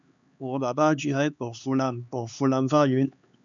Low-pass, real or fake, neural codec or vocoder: 7.2 kHz; fake; codec, 16 kHz, 4 kbps, X-Codec, HuBERT features, trained on LibriSpeech